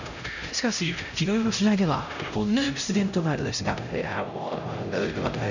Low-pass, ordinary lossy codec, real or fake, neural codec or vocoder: 7.2 kHz; none; fake; codec, 16 kHz, 0.5 kbps, X-Codec, HuBERT features, trained on LibriSpeech